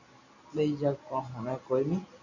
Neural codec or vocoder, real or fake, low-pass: none; real; 7.2 kHz